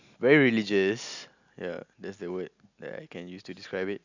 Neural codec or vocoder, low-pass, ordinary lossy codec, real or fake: none; 7.2 kHz; none; real